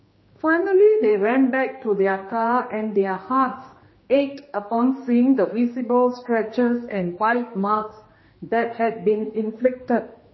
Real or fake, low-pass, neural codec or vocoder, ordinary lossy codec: fake; 7.2 kHz; codec, 16 kHz, 2 kbps, X-Codec, HuBERT features, trained on general audio; MP3, 24 kbps